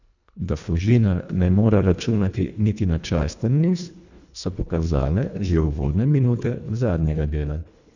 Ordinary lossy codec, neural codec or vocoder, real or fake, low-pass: none; codec, 24 kHz, 1.5 kbps, HILCodec; fake; 7.2 kHz